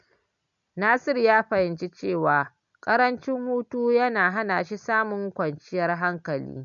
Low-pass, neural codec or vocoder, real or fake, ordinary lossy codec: 7.2 kHz; none; real; none